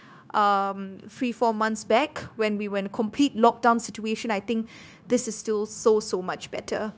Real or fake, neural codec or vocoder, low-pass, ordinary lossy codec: fake; codec, 16 kHz, 0.9 kbps, LongCat-Audio-Codec; none; none